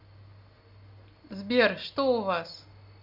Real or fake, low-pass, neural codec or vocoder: real; 5.4 kHz; none